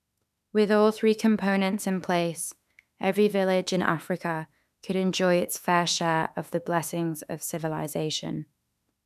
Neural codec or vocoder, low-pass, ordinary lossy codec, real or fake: autoencoder, 48 kHz, 32 numbers a frame, DAC-VAE, trained on Japanese speech; 14.4 kHz; none; fake